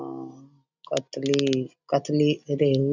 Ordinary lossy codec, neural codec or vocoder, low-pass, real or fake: none; none; 7.2 kHz; real